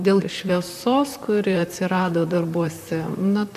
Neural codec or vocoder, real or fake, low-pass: vocoder, 44.1 kHz, 128 mel bands, Pupu-Vocoder; fake; 14.4 kHz